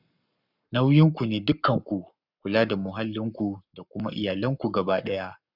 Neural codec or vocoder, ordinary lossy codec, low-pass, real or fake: codec, 44.1 kHz, 7.8 kbps, Pupu-Codec; none; 5.4 kHz; fake